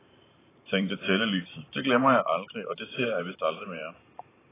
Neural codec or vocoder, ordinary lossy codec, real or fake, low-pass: vocoder, 44.1 kHz, 128 mel bands every 512 samples, BigVGAN v2; AAC, 16 kbps; fake; 3.6 kHz